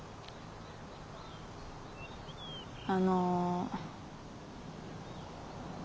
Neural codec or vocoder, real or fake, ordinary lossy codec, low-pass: none; real; none; none